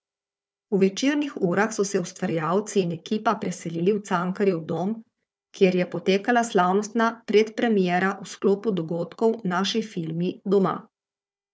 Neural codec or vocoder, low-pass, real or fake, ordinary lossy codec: codec, 16 kHz, 4 kbps, FunCodec, trained on Chinese and English, 50 frames a second; none; fake; none